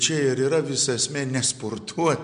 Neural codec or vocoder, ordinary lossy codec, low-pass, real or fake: none; MP3, 64 kbps; 9.9 kHz; real